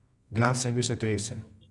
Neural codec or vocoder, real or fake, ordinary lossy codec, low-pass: codec, 24 kHz, 0.9 kbps, WavTokenizer, medium music audio release; fake; none; 10.8 kHz